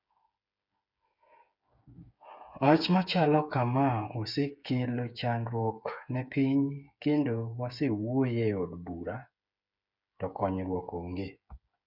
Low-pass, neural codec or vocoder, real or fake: 5.4 kHz; codec, 16 kHz, 8 kbps, FreqCodec, smaller model; fake